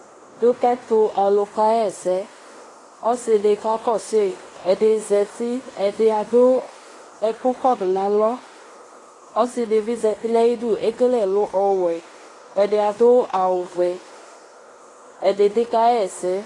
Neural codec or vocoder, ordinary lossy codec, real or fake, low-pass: codec, 16 kHz in and 24 kHz out, 0.9 kbps, LongCat-Audio-Codec, fine tuned four codebook decoder; AAC, 32 kbps; fake; 10.8 kHz